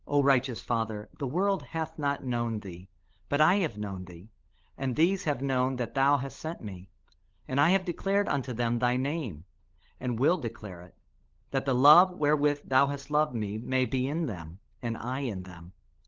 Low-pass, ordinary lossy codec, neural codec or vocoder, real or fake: 7.2 kHz; Opus, 16 kbps; codec, 16 kHz, 16 kbps, FunCodec, trained on LibriTTS, 50 frames a second; fake